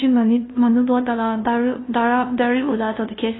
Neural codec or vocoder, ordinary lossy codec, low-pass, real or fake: codec, 16 kHz, 0.5 kbps, FunCodec, trained on LibriTTS, 25 frames a second; AAC, 16 kbps; 7.2 kHz; fake